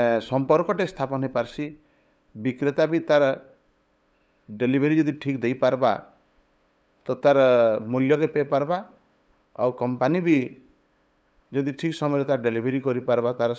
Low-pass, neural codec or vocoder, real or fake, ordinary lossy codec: none; codec, 16 kHz, 8 kbps, FunCodec, trained on LibriTTS, 25 frames a second; fake; none